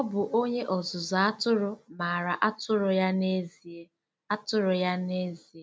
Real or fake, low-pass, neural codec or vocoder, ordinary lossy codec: real; none; none; none